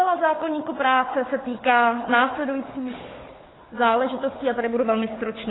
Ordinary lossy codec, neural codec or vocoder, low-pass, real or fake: AAC, 16 kbps; codec, 16 kHz, 4 kbps, FunCodec, trained on Chinese and English, 50 frames a second; 7.2 kHz; fake